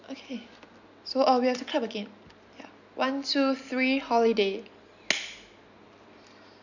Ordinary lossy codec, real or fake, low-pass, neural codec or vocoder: none; real; 7.2 kHz; none